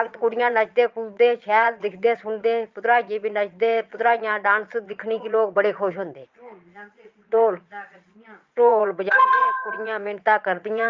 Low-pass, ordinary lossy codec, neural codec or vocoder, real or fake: 7.2 kHz; Opus, 24 kbps; vocoder, 22.05 kHz, 80 mel bands, Vocos; fake